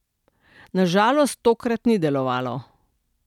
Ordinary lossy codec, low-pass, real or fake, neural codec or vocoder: none; 19.8 kHz; real; none